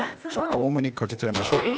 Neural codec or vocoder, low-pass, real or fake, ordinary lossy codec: codec, 16 kHz, 0.8 kbps, ZipCodec; none; fake; none